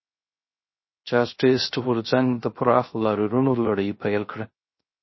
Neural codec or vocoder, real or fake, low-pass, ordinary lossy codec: codec, 16 kHz, 0.3 kbps, FocalCodec; fake; 7.2 kHz; MP3, 24 kbps